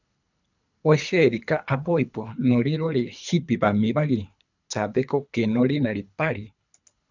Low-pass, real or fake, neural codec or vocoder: 7.2 kHz; fake; codec, 24 kHz, 3 kbps, HILCodec